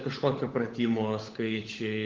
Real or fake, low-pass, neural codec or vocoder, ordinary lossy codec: fake; 7.2 kHz; codec, 16 kHz, 16 kbps, FunCodec, trained on LibriTTS, 50 frames a second; Opus, 16 kbps